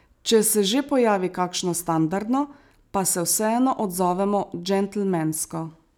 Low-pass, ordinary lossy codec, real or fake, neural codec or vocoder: none; none; real; none